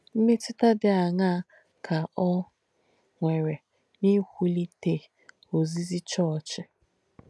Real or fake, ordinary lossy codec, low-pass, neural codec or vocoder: real; none; none; none